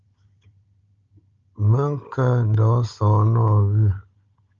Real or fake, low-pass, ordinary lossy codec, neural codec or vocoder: fake; 7.2 kHz; Opus, 24 kbps; codec, 16 kHz, 16 kbps, FunCodec, trained on Chinese and English, 50 frames a second